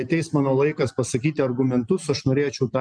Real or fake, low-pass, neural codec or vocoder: real; 9.9 kHz; none